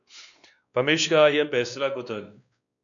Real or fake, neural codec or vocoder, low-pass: fake; codec, 16 kHz, 2 kbps, X-Codec, WavLM features, trained on Multilingual LibriSpeech; 7.2 kHz